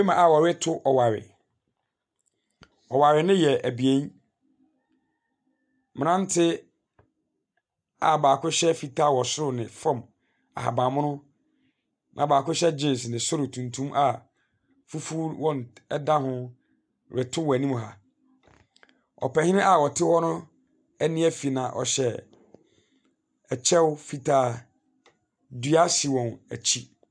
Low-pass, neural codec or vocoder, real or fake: 9.9 kHz; none; real